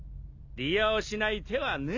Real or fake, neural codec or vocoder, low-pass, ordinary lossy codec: real; none; 7.2 kHz; MP3, 48 kbps